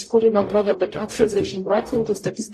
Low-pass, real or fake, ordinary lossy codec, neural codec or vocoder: 14.4 kHz; fake; AAC, 48 kbps; codec, 44.1 kHz, 0.9 kbps, DAC